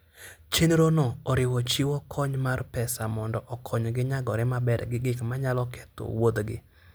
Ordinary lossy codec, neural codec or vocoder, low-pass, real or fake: none; none; none; real